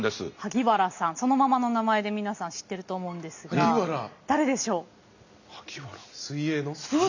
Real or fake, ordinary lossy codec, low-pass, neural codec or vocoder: real; none; 7.2 kHz; none